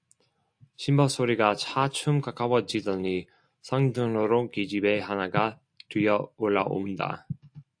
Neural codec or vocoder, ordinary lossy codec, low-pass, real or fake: none; AAC, 64 kbps; 9.9 kHz; real